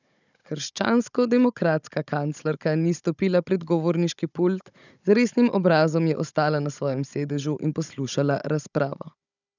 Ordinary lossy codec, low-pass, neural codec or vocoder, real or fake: none; 7.2 kHz; codec, 16 kHz, 16 kbps, FunCodec, trained on Chinese and English, 50 frames a second; fake